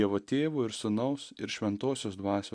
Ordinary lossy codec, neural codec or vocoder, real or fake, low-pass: AAC, 64 kbps; none; real; 9.9 kHz